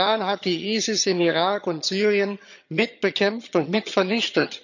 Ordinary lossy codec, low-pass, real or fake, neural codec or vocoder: none; 7.2 kHz; fake; vocoder, 22.05 kHz, 80 mel bands, HiFi-GAN